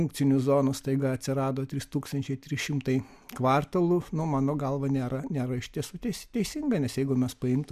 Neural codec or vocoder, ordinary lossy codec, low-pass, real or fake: none; MP3, 96 kbps; 14.4 kHz; real